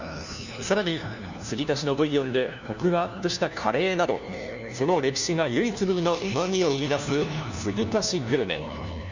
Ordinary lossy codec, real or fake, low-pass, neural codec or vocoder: none; fake; 7.2 kHz; codec, 16 kHz, 1 kbps, FunCodec, trained on LibriTTS, 50 frames a second